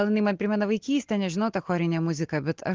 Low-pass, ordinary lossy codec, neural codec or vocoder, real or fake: 7.2 kHz; Opus, 16 kbps; none; real